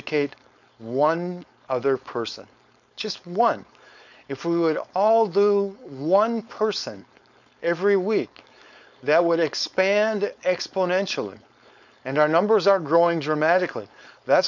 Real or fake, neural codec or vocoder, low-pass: fake; codec, 16 kHz, 4.8 kbps, FACodec; 7.2 kHz